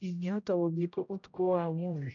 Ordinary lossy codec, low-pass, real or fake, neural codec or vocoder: none; 7.2 kHz; fake; codec, 16 kHz, 0.5 kbps, X-Codec, HuBERT features, trained on general audio